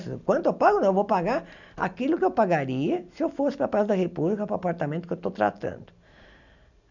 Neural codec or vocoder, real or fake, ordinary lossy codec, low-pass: none; real; none; 7.2 kHz